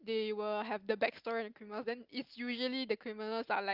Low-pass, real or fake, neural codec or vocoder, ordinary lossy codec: 5.4 kHz; real; none; Opus, 24 kbps